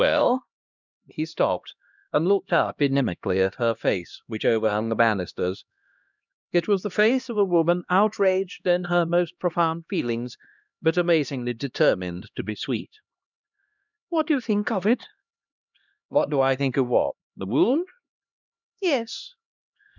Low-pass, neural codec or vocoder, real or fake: 7.2 kHz; codec, 16 kHz, 1 kbps, X-Codec, HuBERT features, trained on LibriSpeech; fake